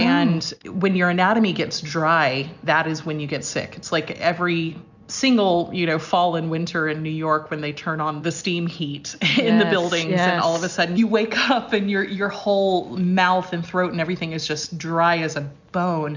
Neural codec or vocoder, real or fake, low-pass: none; real; 7.2 kHz